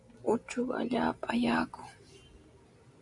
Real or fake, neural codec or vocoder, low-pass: real; none; 10.8 kHz